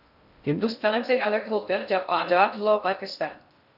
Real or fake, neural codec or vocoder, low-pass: fake; codec, 16 kHz in and 24 kHz out, 0.6 kbps, FocalCodec, streaming, 2048 codes; 5.4 kHz